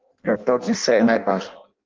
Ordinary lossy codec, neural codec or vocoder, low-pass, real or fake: Opus, 32 kbps; codec, 16 kHz in and 24 kHz out, 0.6 kbps, FireRedTTS-2 codec; 7.2 kHz; fake